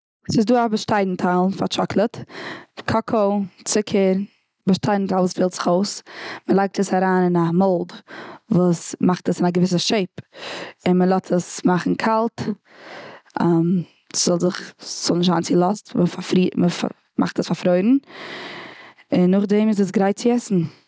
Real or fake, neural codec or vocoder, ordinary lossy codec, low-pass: real; none; none; none